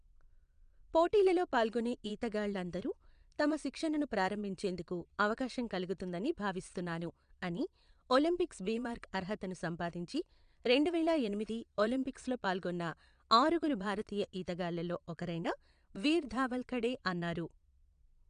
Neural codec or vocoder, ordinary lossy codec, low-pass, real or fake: vocoder, 22.05 kHz, 80 mel bands, Vocos; MP3, 96 kbps; 9.9 kHz; fake